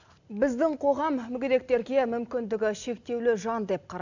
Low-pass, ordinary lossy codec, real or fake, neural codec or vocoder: 7.2 kHz; none; real; none